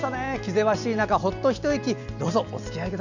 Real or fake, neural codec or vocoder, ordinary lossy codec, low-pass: real; none; none; 7.2 kHz